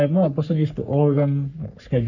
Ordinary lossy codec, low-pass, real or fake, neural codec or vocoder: none; 7.2 kHz; fake; codec, 44.1 kHz, 3.4 kbps, Pupu-Codec